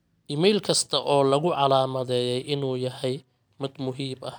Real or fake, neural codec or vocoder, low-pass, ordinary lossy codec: real; none; none; none